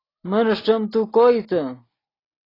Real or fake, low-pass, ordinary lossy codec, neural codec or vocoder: real; 5.4 kHz; AAC, 24 kbps; none